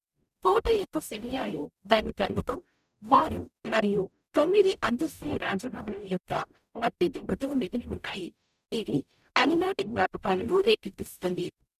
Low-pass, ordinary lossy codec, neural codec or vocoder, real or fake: 14.4 kHz; none; codec, 44.1 kHz, 0.9 kbps, DAC; fake